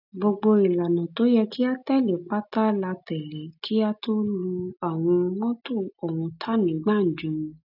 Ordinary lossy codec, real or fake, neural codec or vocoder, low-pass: none; real; none; 5.4 kHz